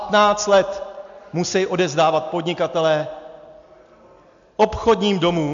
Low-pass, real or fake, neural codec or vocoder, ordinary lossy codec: 7.2 kHz; real; none; MP3, 64 kbps